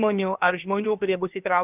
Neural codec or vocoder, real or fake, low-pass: codec, 16 kHz, about 1 kbps, DyCAST, with the encoder's durations; fake; 3.6 kHz